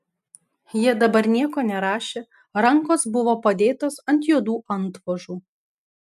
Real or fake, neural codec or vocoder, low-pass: real; none; 14.4 kHz